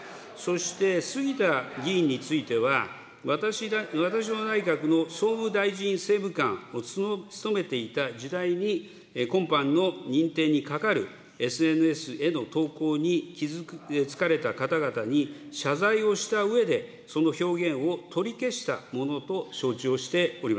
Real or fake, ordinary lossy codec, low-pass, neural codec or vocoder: real; none; none; none